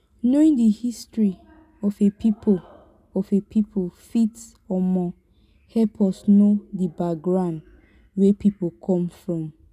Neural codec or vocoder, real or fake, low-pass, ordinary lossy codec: none; real; 14.4 kHz; none